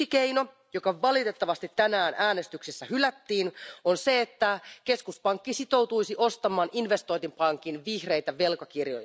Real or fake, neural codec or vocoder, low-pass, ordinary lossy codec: real; none; none; none